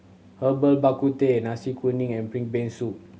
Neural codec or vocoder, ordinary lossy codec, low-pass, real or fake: none; none; none; real